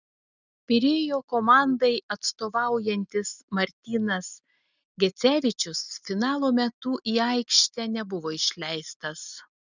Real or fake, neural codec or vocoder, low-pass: real; none; 7.2 kHz